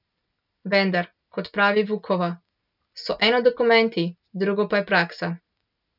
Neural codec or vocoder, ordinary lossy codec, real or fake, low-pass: none; none; real; 5.4 kHz